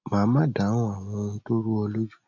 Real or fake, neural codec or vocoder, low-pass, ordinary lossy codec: real; none; 7.2 kHz; none